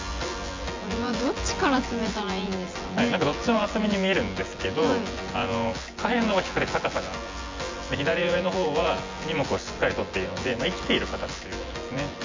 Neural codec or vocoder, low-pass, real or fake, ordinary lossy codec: vocoder, 24 kHz, 100 mel bands, Vocos; 7.2 kHz; fake; MP3, 48 kbps